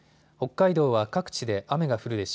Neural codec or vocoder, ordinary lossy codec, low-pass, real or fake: none; none; none; real